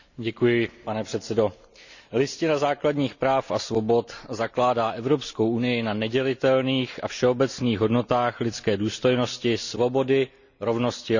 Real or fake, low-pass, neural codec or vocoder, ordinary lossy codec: real; 7.2 kHz; none; none